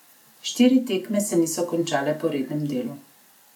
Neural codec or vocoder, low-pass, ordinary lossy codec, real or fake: none; 19.8 kHz; none; real